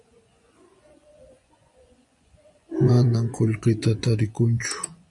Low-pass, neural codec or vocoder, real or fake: 10.8 kHz; vocoder, 24 kHz, 100 mel bands, Vocos; fake